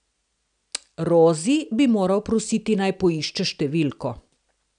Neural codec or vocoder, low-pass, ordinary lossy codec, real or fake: none; 9.9 kHz; none; real